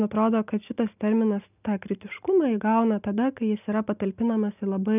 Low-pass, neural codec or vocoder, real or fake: 3.6 kHz; none; real